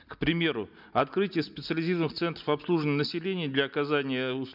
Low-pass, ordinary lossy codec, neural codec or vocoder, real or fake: 5.4 kHz; none; none; real